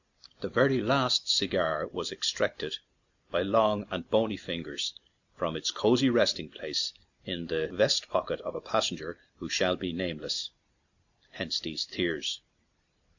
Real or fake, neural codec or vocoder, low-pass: real; none; 7.2 kHz